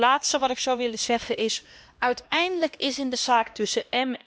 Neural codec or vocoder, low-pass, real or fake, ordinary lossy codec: codec, 16 kHz, 1 kbps, X-Codec, HuBERT features, trained on LibriSpeech; none; fake; none